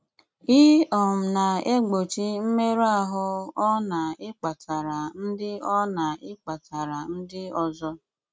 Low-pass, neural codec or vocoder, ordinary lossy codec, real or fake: none; none; none; real